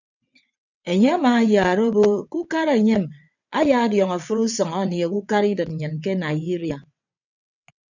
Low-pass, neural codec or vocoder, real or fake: 7.2 kHz; vocoder, 22.05 kHz, 80 mel bands, WaveNeXt; fake